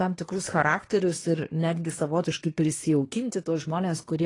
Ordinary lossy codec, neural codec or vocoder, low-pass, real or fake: AAC, 32 kbps; codec, 24 kHz, 1 kbps, SNAC; 10.8 kHz; fake